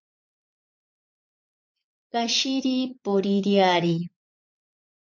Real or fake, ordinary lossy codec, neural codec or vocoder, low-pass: fake; MP3, 48 kbps; vocoder, 22.05 kHz, 80 mel bands, Vocos; 7.2 kHz